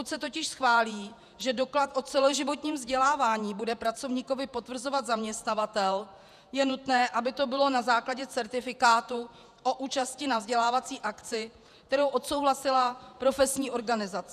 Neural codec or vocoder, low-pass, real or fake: vocoder, 48 kHz, 128 mel bands, Vocos; 14.4 kHz; fake